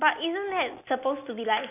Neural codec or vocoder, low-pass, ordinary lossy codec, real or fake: none; 3.6 kHz; none; real